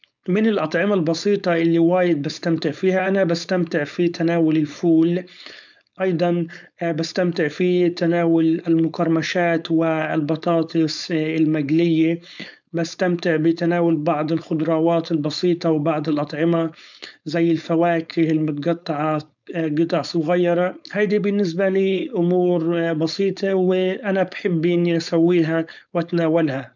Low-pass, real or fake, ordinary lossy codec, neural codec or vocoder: 7.2 kHz; fake; none; codec, 16 kHz, 4.8 kbps, FACodec